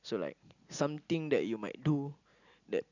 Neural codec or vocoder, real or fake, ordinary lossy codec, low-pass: none; real; none; 7.2 kHz